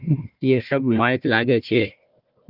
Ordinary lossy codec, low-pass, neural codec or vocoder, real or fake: Opus, 24 kbps; 5.4 kHz; codec, 16 kHz, 1 kbps, FunCodec, trained on Chinese and English, 50 frames a second; fake